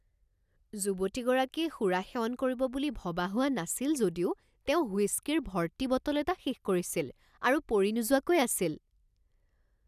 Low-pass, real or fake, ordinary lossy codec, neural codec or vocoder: 14.4 kHz; real; none; none